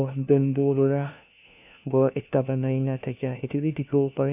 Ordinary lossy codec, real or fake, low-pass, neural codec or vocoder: none; fake; 3.6 kHz; codec, 16 kHz, 1 kbps, FunCodec, trained on LibriTTS, 50 frames a second